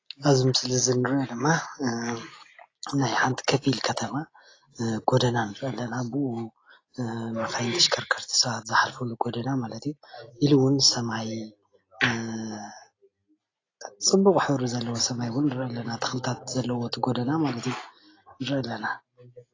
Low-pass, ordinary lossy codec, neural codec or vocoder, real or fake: 7.2 kHz; AAC, 32 kbps; none; real